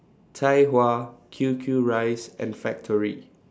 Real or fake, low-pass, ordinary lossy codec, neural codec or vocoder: real; none; none; none